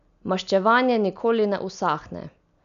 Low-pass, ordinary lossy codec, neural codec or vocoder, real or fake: 7.2 kHz; none; none; real